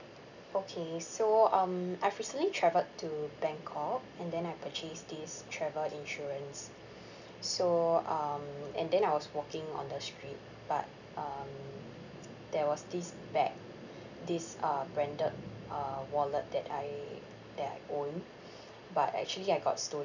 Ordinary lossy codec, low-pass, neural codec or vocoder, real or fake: none; 7.2 kHz; none; real